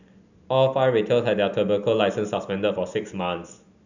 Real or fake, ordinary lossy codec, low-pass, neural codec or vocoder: real; none; 7.2 kHz; none